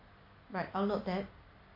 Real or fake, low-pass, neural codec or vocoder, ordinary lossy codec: real; 5.4 kHz; none; MP3, 32 kbps